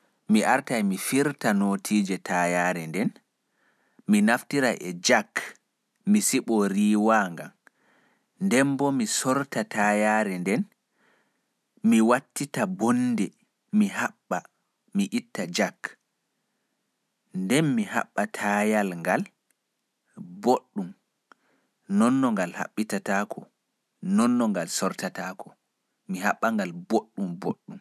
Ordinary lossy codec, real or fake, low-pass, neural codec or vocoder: none; real; 14.4 kHz; none